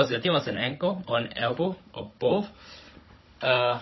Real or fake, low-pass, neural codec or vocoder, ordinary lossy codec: fake; 7.2 kHz; codec, 16 kHz, 16 kbps, FunCodec, trained on Chinese and English, 50 frames a second; MP3, 24 kbps